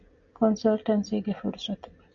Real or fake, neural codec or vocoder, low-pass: real; none; 7.2 kHz